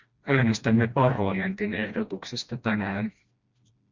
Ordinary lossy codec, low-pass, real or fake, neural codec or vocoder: Opus, 64 kbps; 7.2 kHz; fake; codec, 16 kHz, 1 kbps, FreqCodec, smaller model